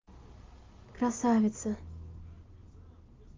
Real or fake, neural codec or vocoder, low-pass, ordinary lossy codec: real; none; 7.2 kHz; Opus, 24 kbps